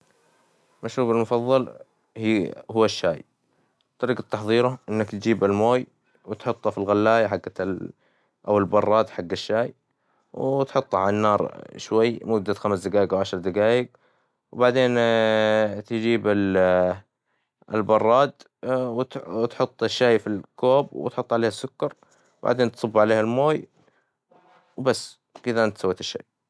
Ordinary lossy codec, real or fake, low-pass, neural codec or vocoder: none; real; none; none